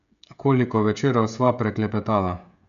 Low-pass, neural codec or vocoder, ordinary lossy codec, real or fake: 7.2 kHz; codec, 16 kHz, 16 kbps, FreqCodec, smaller model; none; fake